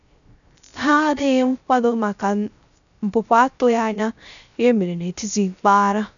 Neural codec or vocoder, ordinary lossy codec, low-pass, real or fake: codec, 16 kHz, 0.3 kbps, FocalCodec; none; 7.2 kHz; fake